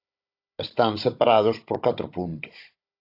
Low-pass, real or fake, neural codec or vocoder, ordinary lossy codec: 5.4 kHz; fake; codec, 16 kHz, 16 kbps, FunCodec, trained on Chinese and English, 50 frames a second; AAC, 32 kbps